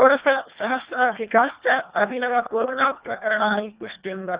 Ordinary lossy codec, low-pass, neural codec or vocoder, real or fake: Opus, 64 kbps; 3.6 kHz; codec, 24 kHz, 1.5 kbps, HILCodec; fake